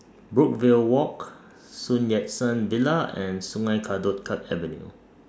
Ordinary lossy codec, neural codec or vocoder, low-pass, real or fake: none; none; none; real